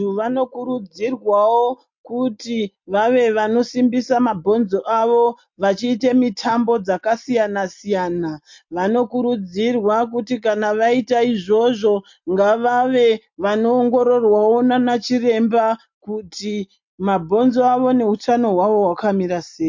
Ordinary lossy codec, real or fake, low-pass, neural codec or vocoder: MP3, 48 kbps; real; 7.2 kHz; none